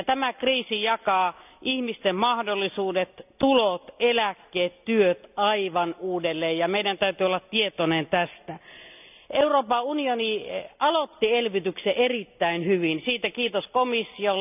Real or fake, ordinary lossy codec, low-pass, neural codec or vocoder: real; none; 3.6 kHz; none